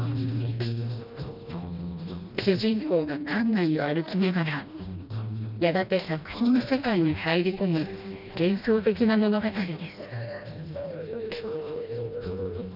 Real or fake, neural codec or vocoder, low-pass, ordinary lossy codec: fake; codec, 16 kHz, 1 kbps, FreqCodec, smaller model; 5.4 kHz; none